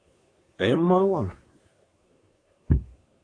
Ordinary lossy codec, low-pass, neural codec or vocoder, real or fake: AAC, 64 kbps; 9.9 kHz; codec, 24 kHz, 1 kbps, SNAC; fake